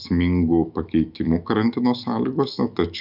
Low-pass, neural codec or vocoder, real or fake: 5.4 kHz; autoencoder, 48 kHz, 128 numbers a frame, DAC-VAE, trained on Japanese speech; fake